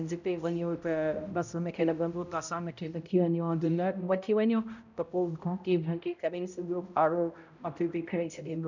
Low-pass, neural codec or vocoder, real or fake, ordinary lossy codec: 7.2 kHz; codec, 16 kHz, 0.5 kbps, X-Codec, HuBERT features, trained on balanced general audio; fake; none